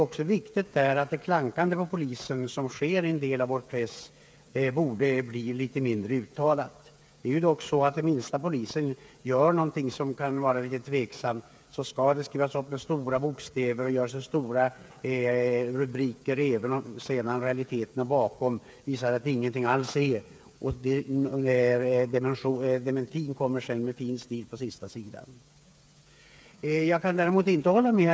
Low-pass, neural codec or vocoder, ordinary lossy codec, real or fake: none; codec, 16 kHz, 8 kbps, FreqCodec, smaller model; none; fake